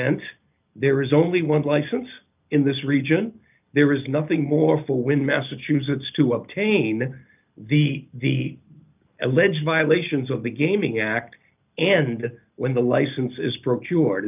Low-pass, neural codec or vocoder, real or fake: 3.6 kHz; none; real